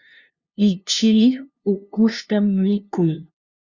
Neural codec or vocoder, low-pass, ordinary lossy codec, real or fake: codec, 16 kHz, 0.5 kbps, FunCodec, trained on LibriTTS, 25 frames a second; 7.2 kHz; Opus, 64 kbps; fake